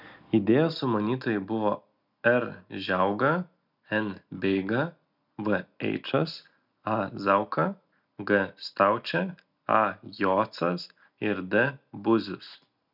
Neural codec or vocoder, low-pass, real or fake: none; 5.4 kHz; real